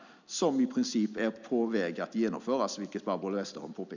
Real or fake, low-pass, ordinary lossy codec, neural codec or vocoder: real; 7.2 kHz; none; none